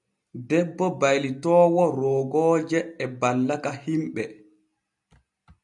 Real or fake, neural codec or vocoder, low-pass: real; none; 10.8 kHz